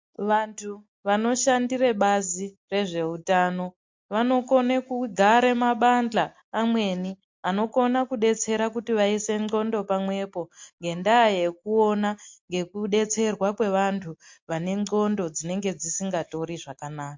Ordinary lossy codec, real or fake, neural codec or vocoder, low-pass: MP3, 48 kbps; real; none; 7.2 kHz